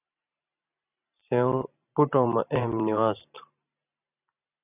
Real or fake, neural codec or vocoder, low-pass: fake; vocoder, 44.1 kHz, 128 mel bands every 512 samples, BigVGAN v2; 3.6 kHz